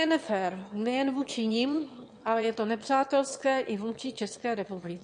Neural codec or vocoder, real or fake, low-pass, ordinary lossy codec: autoencoder, 22.05 kHz, a latent of 192 numbers a frame, VITS, trained on one speaker; fake; 9.9 kHz; MP3, 48 kbps